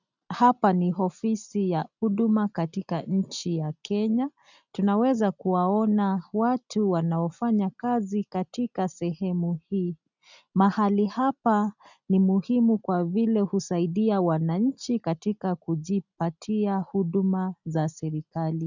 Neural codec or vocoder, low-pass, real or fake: none; 7.2 kHz; real